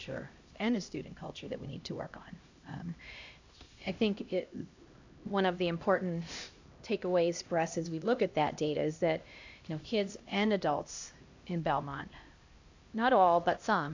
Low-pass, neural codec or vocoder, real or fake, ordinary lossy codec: 7.2 kHz; codec, 16 kHz, 1 kbps, X-Codec, HuBERT features, trained on LibriSpeech; fake; AAC, 48 kbps